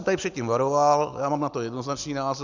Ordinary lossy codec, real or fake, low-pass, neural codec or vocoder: Opus, 64 kbps; real; 7.2 kHz; none